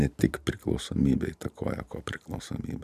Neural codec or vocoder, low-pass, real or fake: vocoder, 44.1 kHz, 128 mel bands every 512 samples, BigVGAN v2; 14.4 kHz; fake